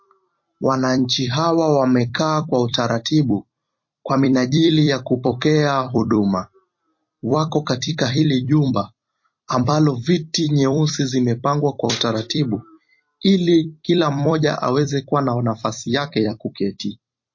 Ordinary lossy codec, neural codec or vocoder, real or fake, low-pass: MP3, 32 kbps; vocoder, 44.1 kHz, 128 mel bands every 256 samples, BigVGAN v2; fake; 7.2 kHz